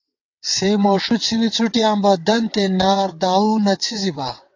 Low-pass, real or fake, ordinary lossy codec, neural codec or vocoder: 7.2 kHz; fake; AAC, 48 kbps; vocoder, 22.05 kHz, 80 mel bands, WaveNeXt